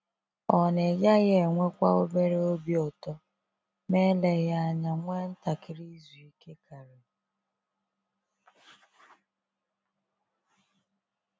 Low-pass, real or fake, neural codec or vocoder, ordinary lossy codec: none; real; none; none